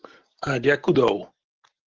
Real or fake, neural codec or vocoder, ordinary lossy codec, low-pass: real; none; Opus, 16 kbps; 7.2 kHz